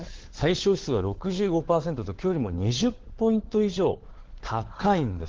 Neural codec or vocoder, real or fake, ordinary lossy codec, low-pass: codec, 16 kHz, 4 kbps, FunCodec, trained on LibriTTS, 50 frames a second; fake; Opus, 16 kbps; 7.2 kHz